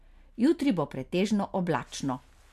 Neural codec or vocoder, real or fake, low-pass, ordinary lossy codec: none; real; 14.4 kHz; MP3, 96 kbps